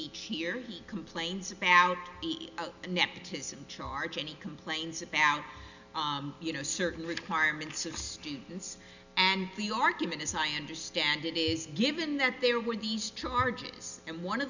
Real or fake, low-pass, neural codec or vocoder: real; 7.2 kHz; none